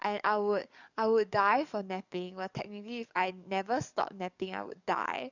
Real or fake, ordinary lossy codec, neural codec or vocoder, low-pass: fake; none; codec, 44.1 kHz, 7.8 kbps, DAC; 7.2 kHz